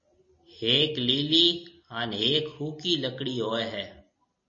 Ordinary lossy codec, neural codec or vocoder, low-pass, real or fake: MP3, 32 kbps; none; 7.2 kHz; real